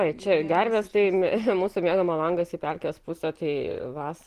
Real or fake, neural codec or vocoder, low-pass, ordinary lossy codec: real; none; 14.4 kHz; Opus, 16 kbps